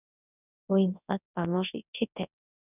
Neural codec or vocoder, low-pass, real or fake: codec, 24 kHz, 0.9 kbps, WavTokenizer, large speech release; 3.6 kHz; fake